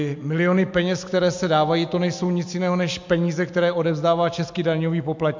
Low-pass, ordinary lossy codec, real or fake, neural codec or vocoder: 7.2 kHz; MP3, 64 kbps; real; none